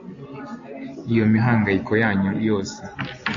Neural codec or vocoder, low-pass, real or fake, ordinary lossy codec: none; 7.2 kHz; real; AAC, 48 kbps